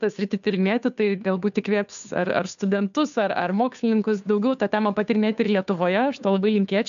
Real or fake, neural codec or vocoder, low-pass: fake; codec, 16 kHz, 2 kbps, FunCodec, trained on Chinese and English, 25 frames a second; 7.2 kHz